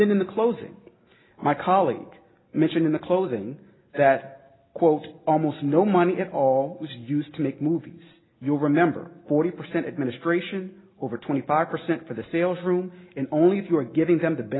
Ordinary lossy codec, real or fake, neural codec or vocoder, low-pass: AAC, 16 kbps; real; none; 7.2 kHz